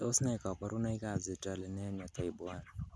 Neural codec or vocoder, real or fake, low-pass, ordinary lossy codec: none; real; none; none